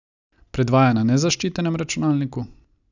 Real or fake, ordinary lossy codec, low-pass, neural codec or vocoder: real; none; 7.2 kHz; none